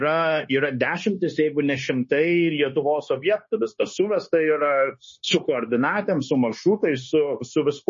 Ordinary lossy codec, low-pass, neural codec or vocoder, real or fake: MP3, 32 kbps; 7.2 kHz; codec, 16 kHz, 0.9 kbps, LongCat-Audio-Codec; fake